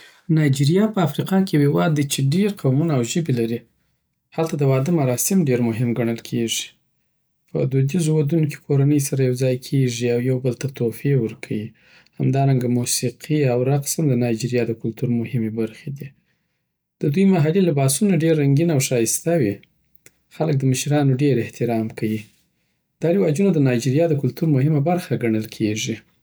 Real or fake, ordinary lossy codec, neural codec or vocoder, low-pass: real; none; none; none